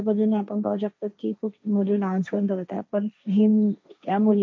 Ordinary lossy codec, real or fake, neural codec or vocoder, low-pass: none; fake; codec, 16 kHz, 1.1 kbps, Voila-Tokenizer; none